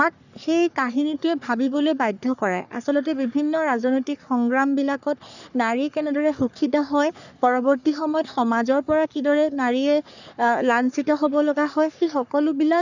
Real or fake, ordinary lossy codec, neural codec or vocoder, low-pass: fake; none; codec, 44.1 kHz, 3.4 kbps, Pupu-Codec; 7.2 kHz